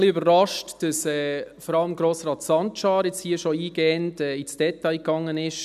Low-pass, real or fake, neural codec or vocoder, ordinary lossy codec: 14.4 kHz; real; none; none